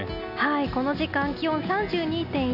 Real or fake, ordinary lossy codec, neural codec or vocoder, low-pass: real; none; none; 5.4 kHz